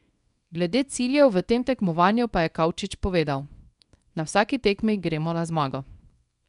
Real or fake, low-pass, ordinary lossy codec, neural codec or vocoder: fake; 10.8 kHz; none; codec, 24 kHz, 0.9 kbps, WavTokenizer, small release